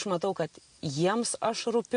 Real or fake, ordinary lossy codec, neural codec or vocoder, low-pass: real; MP3, 48 kbps; none; 9.9 kHz